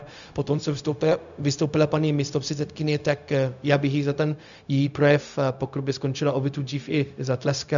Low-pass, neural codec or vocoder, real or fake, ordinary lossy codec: 7.2 kHz; codec, 16 kHz, 0.4 kbps, LongCat-Audio-Codec; fake; MP3, 96 kbps